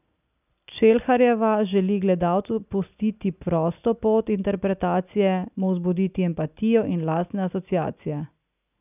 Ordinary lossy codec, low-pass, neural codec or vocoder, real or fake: none; 3.6 kHz; none; real